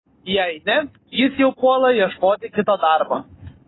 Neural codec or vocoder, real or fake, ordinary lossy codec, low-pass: none; real; AAC, 16 kbps; 7.2 kHz